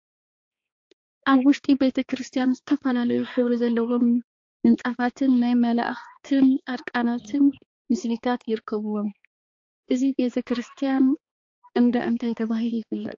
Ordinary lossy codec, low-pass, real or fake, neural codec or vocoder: AAC, 48 kbps; 7.2 kHz; fake; codec, 16 kHz, 2 kbps, X-Codec, HuBERT features, trained on balanced general audio